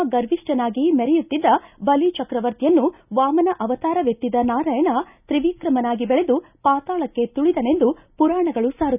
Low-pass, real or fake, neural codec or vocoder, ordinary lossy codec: 3.6 kHz; real; none; none